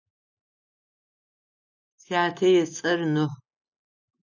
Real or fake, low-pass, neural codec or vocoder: real; 7.2 kHz; none